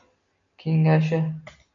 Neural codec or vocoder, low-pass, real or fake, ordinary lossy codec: none; 7.2 kHz; real; MP3, 48 kbps